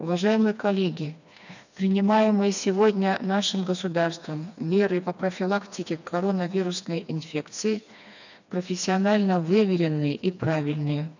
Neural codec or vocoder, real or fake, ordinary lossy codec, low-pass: codec, 16 kHz, 2 kbps, FreqCodec, smaller model; fake; none; 7.2 kHz